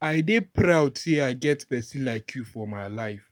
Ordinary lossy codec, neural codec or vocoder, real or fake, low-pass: none; codec, 44.1 kHz, 7.8 kbps, Pupu-Codec; fake; 19.8 kHz